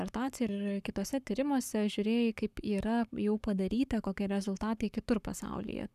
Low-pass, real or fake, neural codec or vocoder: 14.4 kHz; fake; codec, 44.1 kHz, 7.8 kbps, Pupu-Codec